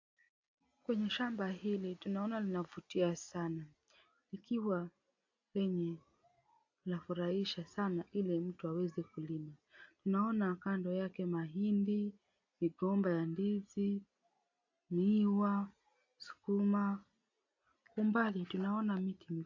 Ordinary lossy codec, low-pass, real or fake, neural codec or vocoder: AAC, 48 kbps; 7.2 kHz; real; none